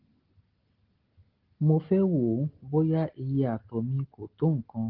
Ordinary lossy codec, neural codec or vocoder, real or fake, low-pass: Opus, 16 kbps; none; real; 5.4 kHz